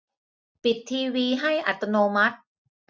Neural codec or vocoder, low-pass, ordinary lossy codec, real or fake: none; none; none; real